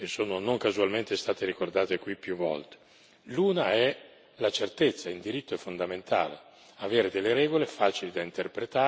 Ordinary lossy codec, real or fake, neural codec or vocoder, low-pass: none; real; none; none